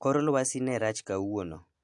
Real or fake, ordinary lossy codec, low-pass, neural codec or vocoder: real; AAC, 64 kbps; 10.8 kHz; none